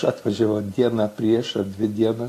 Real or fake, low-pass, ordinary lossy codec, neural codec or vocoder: real; 14.4 kHz; AAC, 48 kbps; none